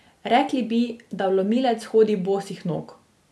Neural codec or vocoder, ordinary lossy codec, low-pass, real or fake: none; none; none; real